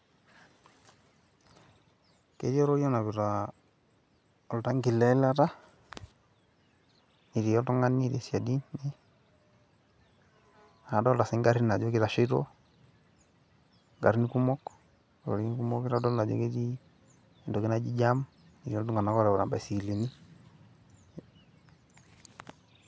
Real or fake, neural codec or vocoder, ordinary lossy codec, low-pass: real; none; none; none